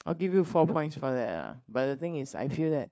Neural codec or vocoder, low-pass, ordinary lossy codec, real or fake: codec, 16 kHz, 4 kbps, FunCodec, trained on LibriTTS, 50 frames a second; none; none; fake